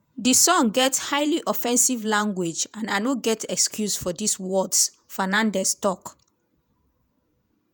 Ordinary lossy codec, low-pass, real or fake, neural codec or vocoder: none; none; fake; vocoder, 48 kHz, 128 mel bands, Vocos